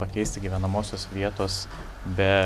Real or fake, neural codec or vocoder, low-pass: real; none; 14.4 kHz